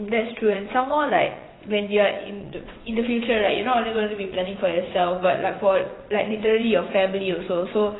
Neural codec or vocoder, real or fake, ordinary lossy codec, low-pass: vocoder, 22.05 kHz, 80 mel bands, WaveNeXt; fake; AAC, 16 kbps; 7.2 kHz